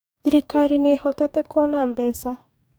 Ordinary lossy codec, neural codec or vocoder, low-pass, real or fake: none; codec, 44.1 kHz, 2.6 kbps, DAC; none; fake